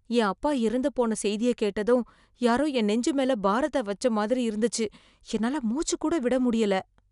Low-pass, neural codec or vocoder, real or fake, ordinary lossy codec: 10.8 kHz; none; real; none